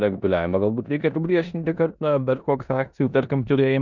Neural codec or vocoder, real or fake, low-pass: codec, 16 kHz in and 24 kHz out, 0.9 kbps, LongCat-Audio-Codec, four codebook decoder; fake; 7.2 kHz